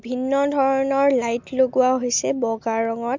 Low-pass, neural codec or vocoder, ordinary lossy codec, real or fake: 7.2 kHz; none; MP3, 64 kbps; real